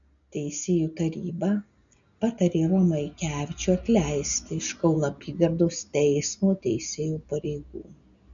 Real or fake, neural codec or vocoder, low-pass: real; none; 7.2 kHz